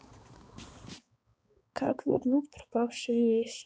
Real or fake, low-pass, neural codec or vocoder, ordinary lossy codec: fake; none; codec, 16 kHz, 4 kbps, X-Codec, HuBERT features, trained on balanced general audio; none